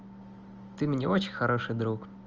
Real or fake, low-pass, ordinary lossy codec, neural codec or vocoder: real; 7.2 kHz; Opus, 24 kbps; none